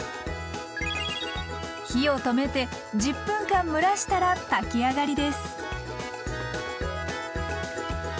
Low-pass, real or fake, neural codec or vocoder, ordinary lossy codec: none; real; none; none